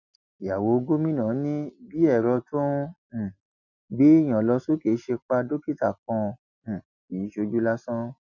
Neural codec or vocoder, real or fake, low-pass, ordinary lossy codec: none; real; 7.2 kHz; none